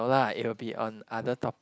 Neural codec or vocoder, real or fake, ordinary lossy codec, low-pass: none; real; none; none